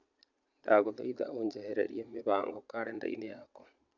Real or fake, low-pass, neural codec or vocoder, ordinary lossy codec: fake; 7.2 kHz; vocoder, 22.05 kHz, 80 mel bands, Vocos; none